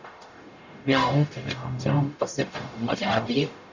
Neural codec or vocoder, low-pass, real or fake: codec, 44.1 kHz, 0.9 kbps, DAC; 7.2 kHz; fake